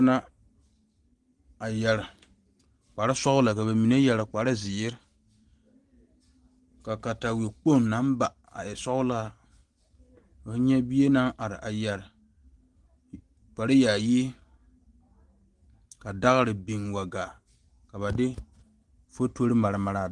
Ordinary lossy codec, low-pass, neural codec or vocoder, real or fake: Opus, 24 kbps; 10.8 kHz; none; real